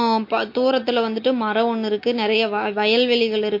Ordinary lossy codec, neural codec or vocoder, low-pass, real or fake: MP3, 32 kbps; none; 5.4 kHz; real